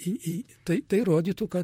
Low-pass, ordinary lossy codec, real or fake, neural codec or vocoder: 19.8 kHz; MP3, 64 kbps; fake; vocoder, 44.1 kHz, 128 mel bands, Pupu-Vocoder